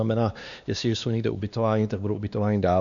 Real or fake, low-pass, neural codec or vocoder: fake; 7.2 kHz; codec, 16 kHz, 2 kbps, X-Codec, WavLM features, trained on Multilingual LibriSpeech